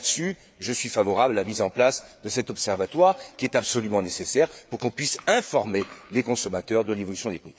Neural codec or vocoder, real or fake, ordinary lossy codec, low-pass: codec, 16 kHz, 4 kbps, FreqCodec, larger model; fake; none; none